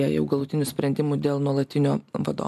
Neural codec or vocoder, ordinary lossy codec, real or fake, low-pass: none; MP3, 96 kbps; real; 14.4 kHz